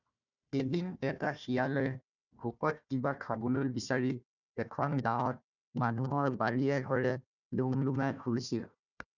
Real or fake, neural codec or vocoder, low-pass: fake; codec, 16 kHz, 1 kbps, FunCodec, trained on Chinese and English, 50 frames a second; 7.2 kHz